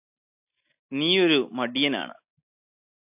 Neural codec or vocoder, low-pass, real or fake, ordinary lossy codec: none; 3.6 kHz; real; AAC, 32 kbps